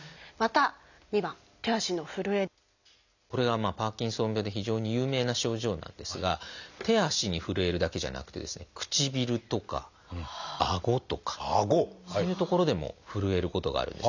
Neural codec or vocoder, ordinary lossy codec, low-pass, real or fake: none; none; 7.2 kHz; real